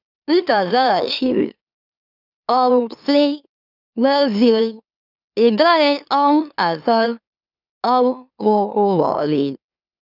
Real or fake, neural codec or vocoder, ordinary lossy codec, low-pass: fake; autoencoder, 44.1 kHz, a latent of 192 numbers a frame, MeloTTS; none; 5.4 kHz